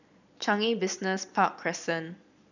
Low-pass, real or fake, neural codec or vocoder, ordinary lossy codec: 7.2 kHz; fake; vocoder, 22.05 kHz, 80 mel bands, Vocos; none